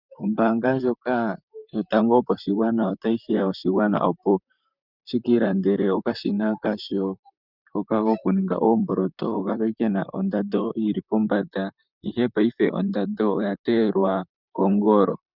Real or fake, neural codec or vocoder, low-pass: fake; vocoder, 44.1 kHz, 128 mel bands, Pupu-Vocoder; 5.4 kHz